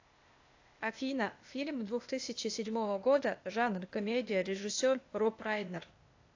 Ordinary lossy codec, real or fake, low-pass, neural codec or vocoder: AAC, 48 kbps; fake; 7.2 kHz; codec, 16 kHz, 0.8 kbps, ZipCodec